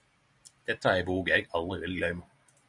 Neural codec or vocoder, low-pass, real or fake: none; 10.8 kHz; real